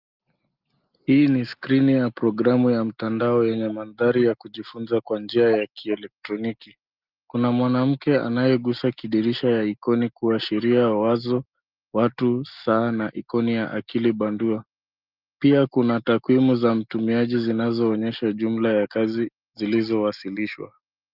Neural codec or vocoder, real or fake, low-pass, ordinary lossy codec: none; real; 5.4 kHz; Opus, 32 kbps